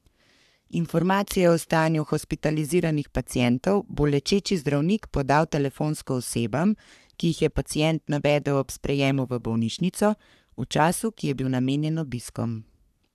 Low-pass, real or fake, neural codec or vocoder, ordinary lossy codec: 14.4 kHz; fake; codec, 44.1 kHz, 3.4 kbps, Pupu-Codec; none